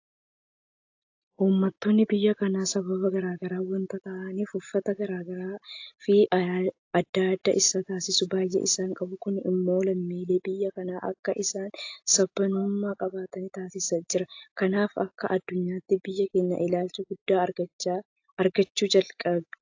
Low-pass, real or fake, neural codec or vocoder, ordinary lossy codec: 7.2 kHz; real; none; AAC, 48 kbps